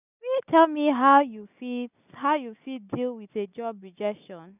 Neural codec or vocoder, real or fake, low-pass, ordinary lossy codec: none; real; 3.6 kHz; none